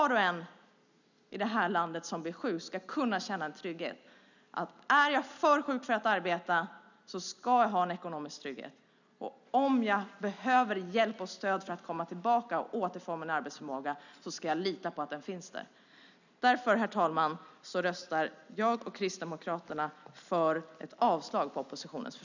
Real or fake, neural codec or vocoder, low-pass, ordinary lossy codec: real; none; 7.2 kHz; none